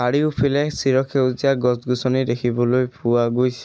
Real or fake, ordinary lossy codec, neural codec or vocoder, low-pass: real; none; none; none